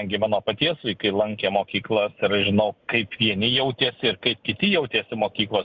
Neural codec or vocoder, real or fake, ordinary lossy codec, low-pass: none; real; Opus, 64 kbps; 7.2 kHz